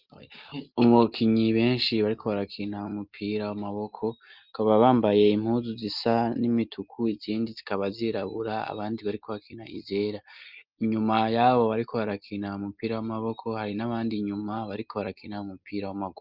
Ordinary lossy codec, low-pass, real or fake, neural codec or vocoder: Opus, 24 kbps; 5.4 kHz; real; none